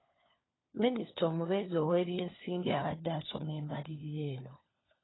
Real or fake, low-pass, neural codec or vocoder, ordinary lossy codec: fake; 7.2 kHz; codec, 16 kHz, 4 kbps, FunCodec, trained on LibriTTS, 50 frames a second; AAC, 16 kbps